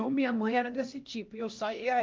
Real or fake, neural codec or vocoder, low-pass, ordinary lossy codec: fake; codec, 16 kHz, 1 kbps, X-Codec, HuBERT features, trained on LibriSpeech; 7.2 kHz; Opus, 32 kbps